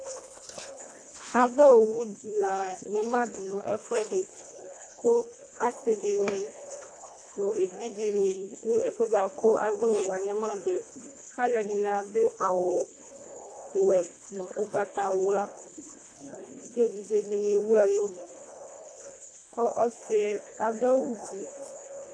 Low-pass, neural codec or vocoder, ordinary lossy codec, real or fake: 9.9 kHz; codec, 24 kHz, 1.5 kbps, HILCodec; AAC, 48 kbps; fake